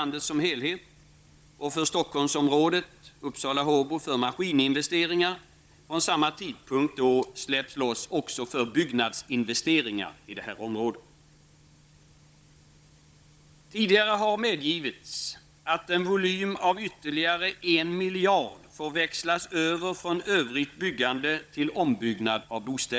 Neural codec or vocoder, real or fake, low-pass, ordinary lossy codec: codec, 16 kHz, 16 kbps, FunCodec, trained on Chinese and English, 50 frames a second; fake; none; none